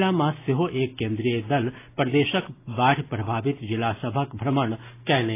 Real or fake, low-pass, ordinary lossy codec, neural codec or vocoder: real; 3.6 kHz; AAC, 24 kbps; none